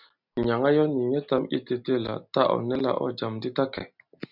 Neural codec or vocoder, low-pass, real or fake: none; 5.4 kHz; real